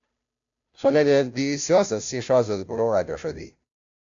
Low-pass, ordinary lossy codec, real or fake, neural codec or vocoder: 7.2 kHz; AAC, 64 kbps; fake; codec, 16 kHz, 0.5 kbps, FunCodec, trained on Chinese and English, 25 frames a second